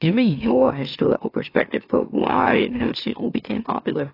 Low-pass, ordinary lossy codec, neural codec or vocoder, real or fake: 5.4 kHz; AAC, 24 kbps; autoencoder, 44.1 kHz, a latent of 192 numbers a frame, MeloTTS; fake